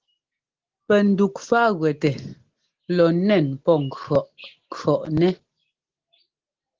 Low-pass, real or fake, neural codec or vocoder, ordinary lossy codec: 7.2 kHz; real; none; Opus, 16 kbps